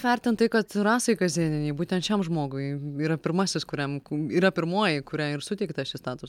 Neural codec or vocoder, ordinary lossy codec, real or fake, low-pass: none; MP3, 96 kbps; real; 19.8 kHz